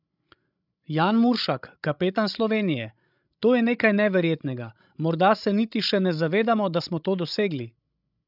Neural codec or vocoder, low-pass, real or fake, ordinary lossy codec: codec, 16 kHz, 16 kbps, FreqCodec, larger model; 5.4 kHz; fake; none